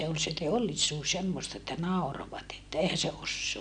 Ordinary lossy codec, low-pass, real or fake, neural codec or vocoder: none; 9.9 kHz; real; none